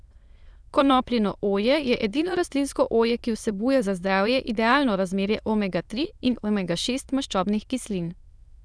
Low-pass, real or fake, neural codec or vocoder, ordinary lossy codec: none; fake; autoencoder, 22.05 kHz, a latent of 192 numbers a frame, VITS, trained on many speakers; none